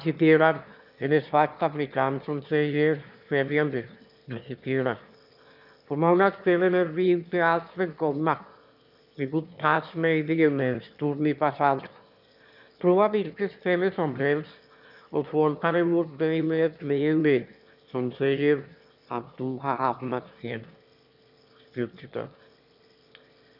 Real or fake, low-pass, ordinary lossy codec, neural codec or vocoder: fake; 5.4 kHz; none; autoencoder, 22.05 kHz, a latent of 192 numbers a frame, VITS, trained on one speaker